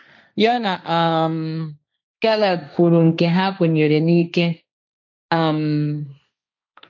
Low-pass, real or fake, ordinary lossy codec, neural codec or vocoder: 7.2 kHz; fake; none; codec, 16 kHz, 1.1 kbps, Voila-Tokenizer